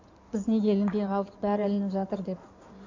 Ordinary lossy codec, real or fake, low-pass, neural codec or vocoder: MP3, 64 kbps; fake; 7.2 kHz; codec, 16 kHz in and 24 kHz out, 2.2 kbps, FireRedTTS-2 codec